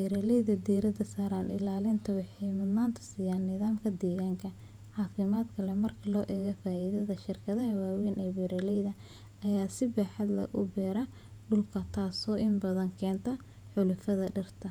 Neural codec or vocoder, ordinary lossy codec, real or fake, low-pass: vocoder, 48 kHz, 128 mel bands, Vocos; none; fake; 19.8 kHz